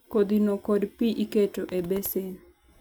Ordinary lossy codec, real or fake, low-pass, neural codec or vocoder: none; real; none; none